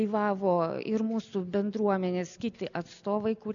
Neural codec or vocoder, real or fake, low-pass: none; real; 7.2 kHz